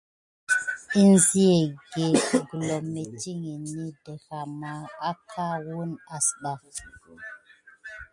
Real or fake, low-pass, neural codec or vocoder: real; 10.8 kHz; none